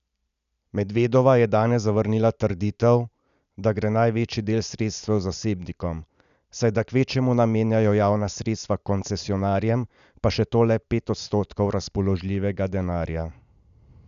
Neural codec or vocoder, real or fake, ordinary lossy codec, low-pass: none; real; none; 7.2 kHz